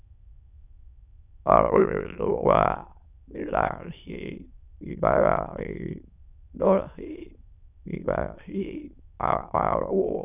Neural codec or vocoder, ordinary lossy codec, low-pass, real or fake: autoencoder, 22.05 kHz, a latent of 192 numbers a frame, VITS, trained on many speakers; none; 3.6 kHz; fake